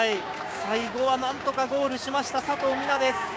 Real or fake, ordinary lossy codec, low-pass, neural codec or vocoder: fake; none; none; codec, 16 kHz, 6 kbps, DAC